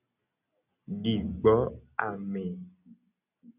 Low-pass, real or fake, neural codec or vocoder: 3.6 kHz; real; none